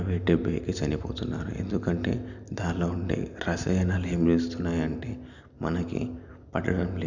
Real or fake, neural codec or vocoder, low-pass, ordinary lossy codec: real; none; 7.2 kHz; none